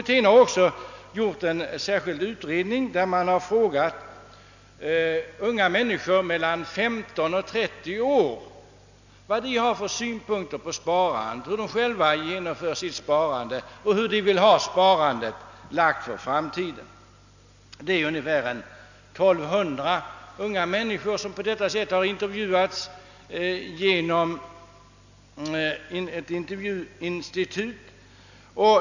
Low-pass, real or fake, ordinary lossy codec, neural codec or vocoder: 7.2 kHz; real; none; none